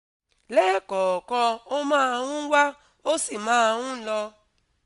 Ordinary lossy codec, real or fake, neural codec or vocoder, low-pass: none; real; none; 10.8 kHz